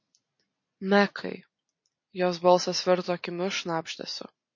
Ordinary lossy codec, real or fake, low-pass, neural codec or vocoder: MP3, 32 kbps; real; 7.2 kHz; none